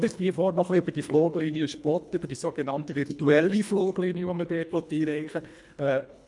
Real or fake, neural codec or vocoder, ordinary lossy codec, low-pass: fake; codec, 24 kHz, 1.5 kbps, HILCodec; none; 10.8 kHz